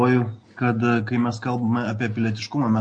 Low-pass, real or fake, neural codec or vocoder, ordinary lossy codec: 9.9 kHz; real; none; MP3, 48 kbps